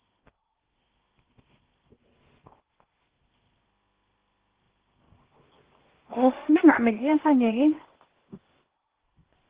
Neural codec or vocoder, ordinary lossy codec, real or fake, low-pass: codec, 16 kHz in and 24 kHz out, 0.8 kbps, FocalCodec, streaming, 65536 codes; Opus, 16 kbps; fake; 3.6 kHz